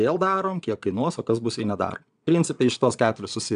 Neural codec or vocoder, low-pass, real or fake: vocoder, 22.05 kHz, 80 mel bands, Vocos; 9.9 kHz; fake